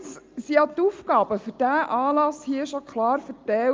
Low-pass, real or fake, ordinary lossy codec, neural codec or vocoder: 7.2 kHz; real; Opus, 24 kbps; none